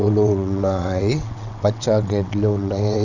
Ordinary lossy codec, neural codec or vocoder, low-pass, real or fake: none; vocoder, 22.05 kHz, 80 mel bands, WaveNeXt; 7.2 kHz; fake